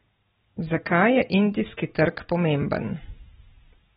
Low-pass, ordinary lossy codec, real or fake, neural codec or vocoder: 19.8 kHz; AAC, 16 kbps; real; none